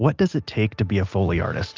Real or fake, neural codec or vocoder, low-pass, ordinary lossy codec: real; none; 7.2 kHz; Opus, 24 kbps